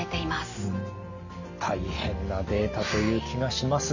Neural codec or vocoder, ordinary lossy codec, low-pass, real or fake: none; none; 7.2 kHz; real